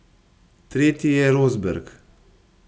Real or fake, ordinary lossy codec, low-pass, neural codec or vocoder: real; none; none; none